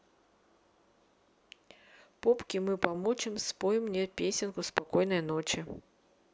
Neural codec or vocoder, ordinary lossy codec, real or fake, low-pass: none; none; real; none